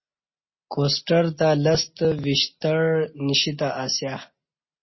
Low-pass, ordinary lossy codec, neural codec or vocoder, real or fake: 7.2 kHz; MP3, 24 kbps; none; real